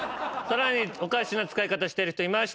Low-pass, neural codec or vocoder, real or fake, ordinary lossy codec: none; none; real; none